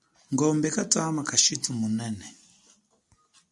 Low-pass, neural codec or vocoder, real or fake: 10.8 kHz; none; real